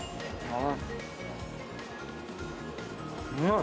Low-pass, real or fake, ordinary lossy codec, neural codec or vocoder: none; real; none; none